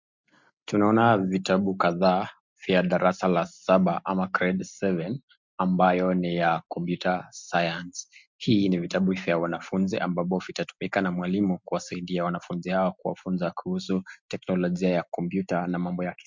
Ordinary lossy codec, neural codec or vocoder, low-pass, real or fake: MP3, 64 kbps; none; 7.2 kHz; real